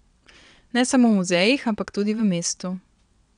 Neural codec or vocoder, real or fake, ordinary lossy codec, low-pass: vocoder, 22.05 kHz, 80 mel bands, WaveNeXt; fake; none; 9.9 kHz